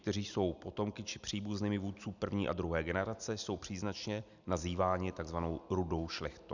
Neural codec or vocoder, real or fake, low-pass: none; real; 7.2 kHz